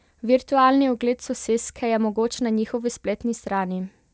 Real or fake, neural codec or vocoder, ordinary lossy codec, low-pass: real; none; none; none